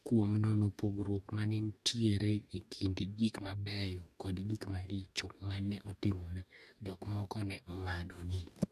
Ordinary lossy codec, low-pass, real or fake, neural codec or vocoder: none; 14.4 kHz; fake; codec, 44.1 kHz, 2.6 kbps, DAC